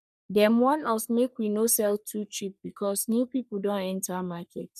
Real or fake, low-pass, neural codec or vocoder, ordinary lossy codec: fake; 14.4 kHz; codec, 44.1 kHz, 3.4 kbps, Pupu-Codec; none